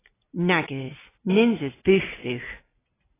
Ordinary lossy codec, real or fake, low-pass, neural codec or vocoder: AAC, 16 kbps; real; 3.6 kHz; none